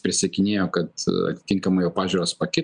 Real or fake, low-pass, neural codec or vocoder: real; 9.9 kHz; none